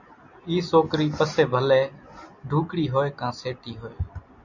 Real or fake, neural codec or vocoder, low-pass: real; none; 7.2 kHz